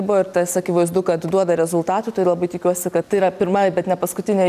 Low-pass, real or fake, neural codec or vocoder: 14.4 kHz; real; none